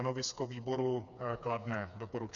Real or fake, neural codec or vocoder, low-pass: fake; codec, 16 kHz, 4 kbps, FreqCodec, smaller model; 7.2 kHz